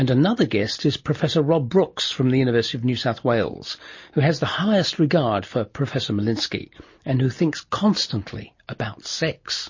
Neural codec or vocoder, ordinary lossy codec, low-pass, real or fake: none; MP3, 32 kbps; 7.2 kHz; real